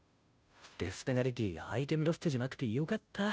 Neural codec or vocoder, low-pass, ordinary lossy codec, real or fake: codec, 16 kHz, 0.5 kbps, FunCodec, trained on Chinese and English, 25 frames a second; none; none; fake